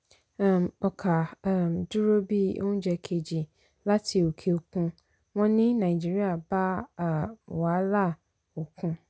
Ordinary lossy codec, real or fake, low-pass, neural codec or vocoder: none; real; none; none